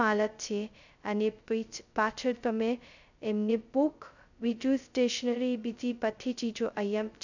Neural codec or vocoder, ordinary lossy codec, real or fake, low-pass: codec, 16 kHz, 0.2 kbps, FocalCodec; none; fake; 7.2 kHz